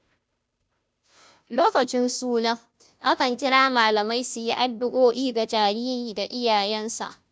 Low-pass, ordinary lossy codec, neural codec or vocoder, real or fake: none; none; codec, 16 kHz, 0.5 kbps, FunCodec, trained on Chinese and English, 25 frames a second; fake